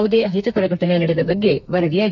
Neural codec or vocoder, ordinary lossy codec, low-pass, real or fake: codec, 32 kHz, 1.9 kbps, SNAC; none; 7.2 kHz; fake